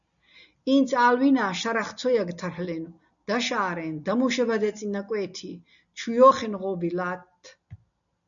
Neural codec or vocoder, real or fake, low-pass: none; real; 7.2 kHz